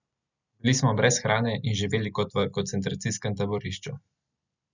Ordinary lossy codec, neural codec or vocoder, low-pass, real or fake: none; none; 7.2 kHz; real